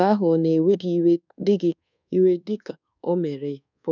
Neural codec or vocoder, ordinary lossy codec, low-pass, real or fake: codec, 24 kHz, 1.2 kbps, DualCodec; none; 7.2 kHz; fake